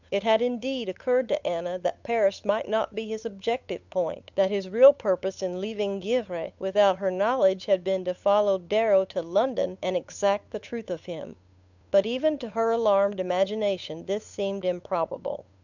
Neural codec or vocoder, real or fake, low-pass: codec, 16 kHz, 8 kbps, FunCodec, trained on Chinese and English, 25 frames a second; fake; 7.2 kHz